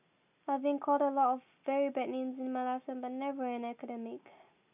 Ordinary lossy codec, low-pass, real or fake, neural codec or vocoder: none; 3.6 kHz; real; none